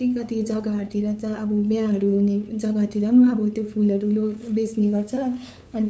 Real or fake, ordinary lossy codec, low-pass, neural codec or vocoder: fake; none; none; codec, 16 kHz, 8 kbps, FunCodec, trained on LibriTTS, 25 frames a second